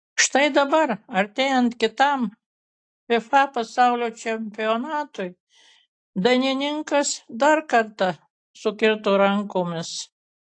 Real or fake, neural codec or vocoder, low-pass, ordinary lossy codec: real; none; 9.9 kHz; AAC, 48 kbps